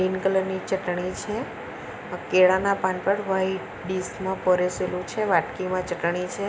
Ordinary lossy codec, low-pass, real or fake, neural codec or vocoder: none; none; real; none